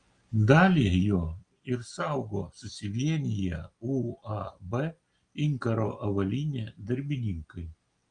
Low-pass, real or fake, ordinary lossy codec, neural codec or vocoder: 9.9 kHz; real; Opus, 32 kbps; none